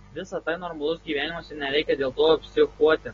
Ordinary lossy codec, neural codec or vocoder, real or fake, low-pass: AAC, 24 kbps; none; real; 7.2 kHz